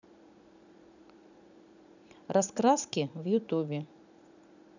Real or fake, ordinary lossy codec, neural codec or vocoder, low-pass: fake; none; vocoder, 44.1 kHz, 80 mel bands, Vocos; 7.2 kHz